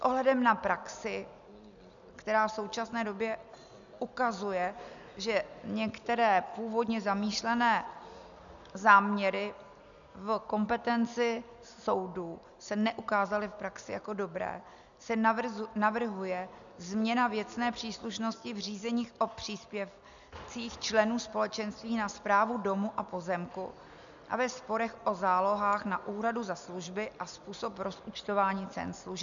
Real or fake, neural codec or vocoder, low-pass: real; none; 7.2 kHz